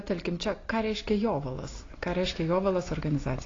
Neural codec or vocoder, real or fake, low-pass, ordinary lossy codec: none; real; 7.2 kHz; AAC, 32 kbps